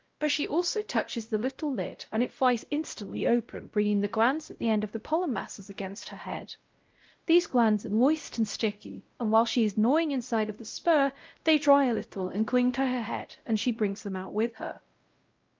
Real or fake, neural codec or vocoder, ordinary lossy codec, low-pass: fake; codec, 16 kHz, 0.5 kbps, X-Codec, WavLM features, trained on Multilingual LibriSpeech; Opus, 32 kbps; 7.2 kHz